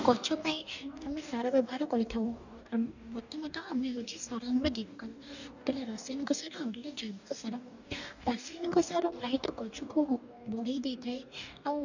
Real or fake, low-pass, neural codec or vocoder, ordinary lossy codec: fake; 7.2 kHz; codec, 44.1 kHz, 2.6 kbps, DAC; none